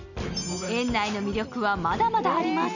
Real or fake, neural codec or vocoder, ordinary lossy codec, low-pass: real; none; none; 7.2 kHz